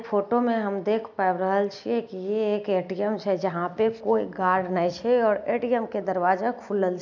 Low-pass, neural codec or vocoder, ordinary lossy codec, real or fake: 7.2 kHz; none; none; real